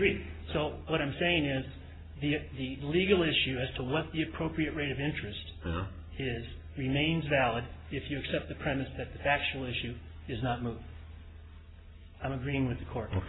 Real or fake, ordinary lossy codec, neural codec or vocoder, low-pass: real; AAC, 16 kbps; none; 7.2 kHz